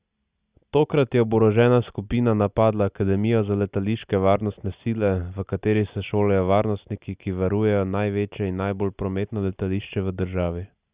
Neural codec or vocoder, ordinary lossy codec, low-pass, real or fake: none; Opus, 64 kbps; 3.6 kHz; real